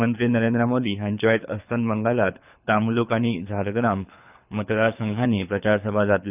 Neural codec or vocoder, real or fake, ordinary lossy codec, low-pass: codec, 24 kHz, 6 kbps, HILCodec; fake; none; 3.6 kHz